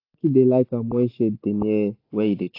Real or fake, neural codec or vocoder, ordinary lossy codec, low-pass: real; none; AAC, 32 kbps; 5.4 kHz